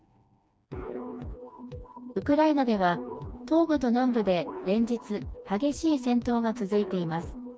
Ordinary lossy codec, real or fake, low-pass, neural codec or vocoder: none; fake; none; codec, 16 kHz, 2 kbps, FreqCodec, smaller model